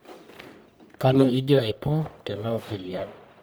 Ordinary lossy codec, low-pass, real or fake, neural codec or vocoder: none; none; fake; codec, 44.1 kHz, 1.7 kbps, Pupu-Codec